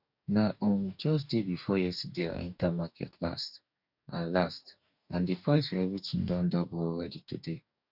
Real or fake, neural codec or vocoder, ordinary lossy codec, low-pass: fake; codec, 44.1 kHz, 2.6 kbps, DAC; none; 5.4 kHz